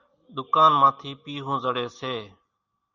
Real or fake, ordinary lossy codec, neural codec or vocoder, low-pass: real; Opus, 64 kbps; none; 7.2 kHz